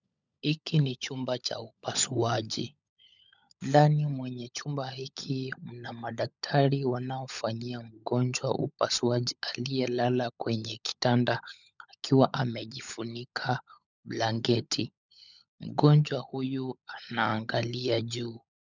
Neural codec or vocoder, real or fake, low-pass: codec, 16 kHz, 16 kbps, FunCodec, trained on LibriTTS, 50 frames a second; fake; 7.2 kHz